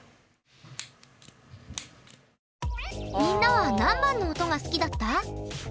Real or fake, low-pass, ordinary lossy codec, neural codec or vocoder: real; none; none; none